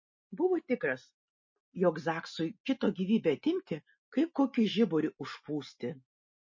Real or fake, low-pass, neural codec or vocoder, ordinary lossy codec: real; 7.2 kHz; none; MP3, 32 kbps